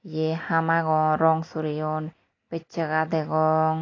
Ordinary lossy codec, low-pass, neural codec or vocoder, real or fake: AAC, 32 kbps; 7.2 kHz; none; real